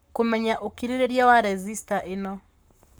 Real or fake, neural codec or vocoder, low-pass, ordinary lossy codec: fake; codec, 44.1 kHz, 7.8 kbps, Pupu-Codec; none; none